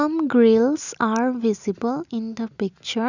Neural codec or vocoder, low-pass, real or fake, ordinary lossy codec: none; 7.2 kHz; real; none